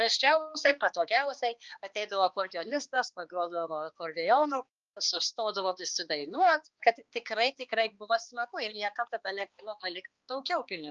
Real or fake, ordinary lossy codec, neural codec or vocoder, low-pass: fake; Opus, 24 kbps; codec, 16 kHz, 2 kbps, X-Codec, HuBERT features, trained on balanced general audio; 7.2 kHz